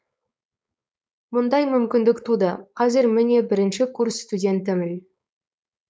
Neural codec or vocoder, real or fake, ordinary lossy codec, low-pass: codec, 16 kHz, 4.8 kbps, FACodec; fake; none; none